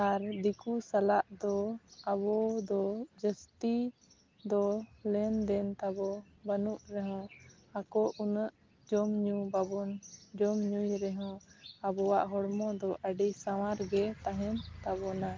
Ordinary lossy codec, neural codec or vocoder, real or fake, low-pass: Opus, 32 kbps; none; real; 7.2 kHz